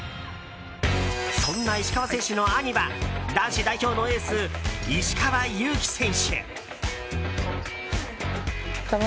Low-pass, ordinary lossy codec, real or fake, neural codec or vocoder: none; none; real; none